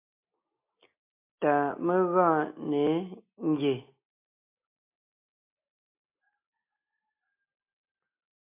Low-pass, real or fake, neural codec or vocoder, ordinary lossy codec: 3.6 kHz; real; none; MP3, 24 kbps